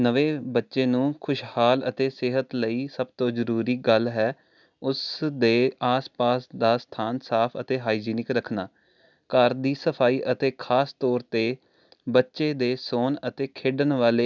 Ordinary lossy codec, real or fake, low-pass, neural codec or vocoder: none; real; 7.2 kHz; none